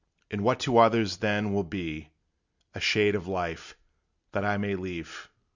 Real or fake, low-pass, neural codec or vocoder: real; 7.2 kHz; none